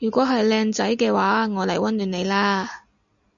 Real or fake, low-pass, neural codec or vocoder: real; 7.2 kHz; none